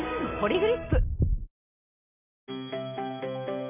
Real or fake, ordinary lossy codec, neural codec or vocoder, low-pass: real; none; none; 3.6 kHz